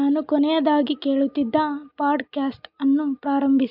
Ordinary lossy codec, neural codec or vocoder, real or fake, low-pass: MP3, 48 kbps; none; real; 5.4 kHz